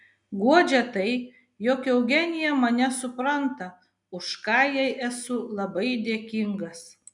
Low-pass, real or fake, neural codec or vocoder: 10.8 kHz; real; none